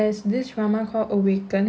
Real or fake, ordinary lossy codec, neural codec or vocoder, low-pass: real; none; none; none